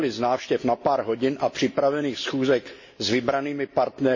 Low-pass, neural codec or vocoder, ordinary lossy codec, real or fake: 7.2 kHz; none; MP3, 32 kbps; real